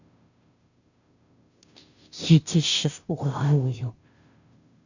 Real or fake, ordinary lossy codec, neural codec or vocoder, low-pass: fake; none; codec, 16 kHz, 0.5 kbps, FunCodec, trained on Chinese and English, 25 frames a second; 7.2 kHz